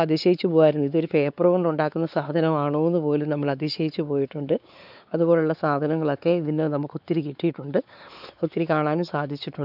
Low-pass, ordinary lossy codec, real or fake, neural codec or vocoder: 5.4 kHz; none; fake; codec, 16 kHz, 4 kbps, X-Codec, WavLM features, trained on Multilingual LibriSpeech